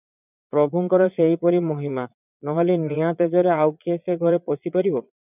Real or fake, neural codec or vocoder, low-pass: fake; vocoder, 22.05 kHz, 80 mel bands, Vocos; 3.6 kHz